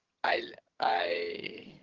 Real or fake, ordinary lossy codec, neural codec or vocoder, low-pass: fake; Opus, 16 kbps; vocoder, 22.05 kHz, 80 mel bands, HiFi-GAN; 7.2 kHz